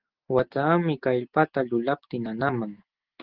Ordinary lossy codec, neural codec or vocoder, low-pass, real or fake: Opus, 16 kbps; none; 5.4 kHz; real